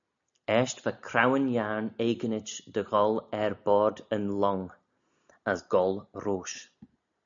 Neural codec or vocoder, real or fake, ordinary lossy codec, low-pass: none; real; MP3, 96 kbps; 7.2 kHz